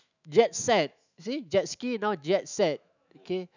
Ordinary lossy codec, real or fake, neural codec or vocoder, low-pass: none; fake; autoencoder, 48 kHz, 128 numbers a frame, DAC-VAE, trained on Japanese speech; 7.2 kHz